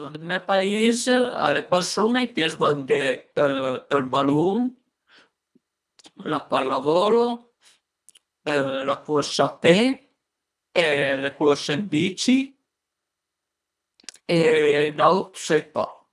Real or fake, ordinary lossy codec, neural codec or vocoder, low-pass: fake; none; codec, 24 kHz, 1.5 kbps, HILCodec; none